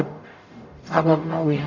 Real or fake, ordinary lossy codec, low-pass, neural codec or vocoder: fake; none; 7.2 kHz; codec, 44.1 kHz, 0.9 kbps, DAC